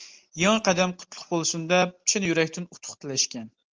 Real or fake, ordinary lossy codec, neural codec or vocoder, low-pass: real; Opus, 24 kbps; none; 7.2 kHz